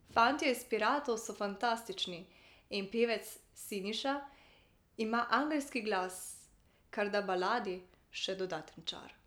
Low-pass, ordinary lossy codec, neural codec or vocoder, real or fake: none; none; none; real